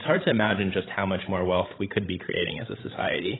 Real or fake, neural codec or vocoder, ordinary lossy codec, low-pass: fake; codec, 16 kHz, 16 kbps, FreqCodec, larger model; AAC, 16 kbps; 7.2 kHz